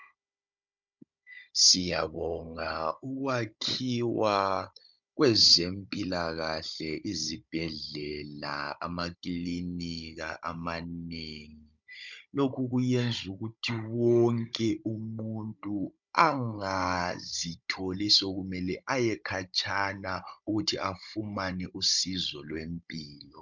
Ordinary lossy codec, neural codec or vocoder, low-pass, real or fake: MP3, 64 kbps; codec, 16 kHz, 16 kbps, FunCodec, trained on Chinese and English, 50 frames a second; 7.2 kHz; fake